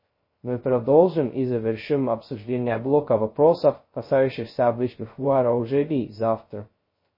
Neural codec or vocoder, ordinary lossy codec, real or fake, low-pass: codec, 16 kHz, 0.2 kbps, FocalCodec; MP3, 24 kbps; fake; 5.4 kHz